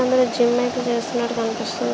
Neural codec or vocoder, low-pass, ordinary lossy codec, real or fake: none; none; none; real